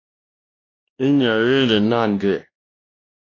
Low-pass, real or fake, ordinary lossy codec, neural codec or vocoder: 7.2 kHz; fake; AAC, 32 kbps; codec, 16 kHz, 1 kbps, X-Codec, WavLM features, trained on Multilingual LibriSpeech